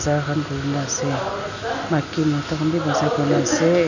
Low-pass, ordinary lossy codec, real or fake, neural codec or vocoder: 7.2 kHz; none; real; none